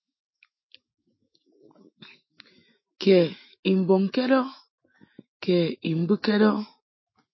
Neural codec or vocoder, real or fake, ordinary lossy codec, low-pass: autoencoder, 48 kHz, 128 numbers a frame, DAC-VAE, trained on Japanese speech; fake; MP3, 24 kbps; 7.2 kHz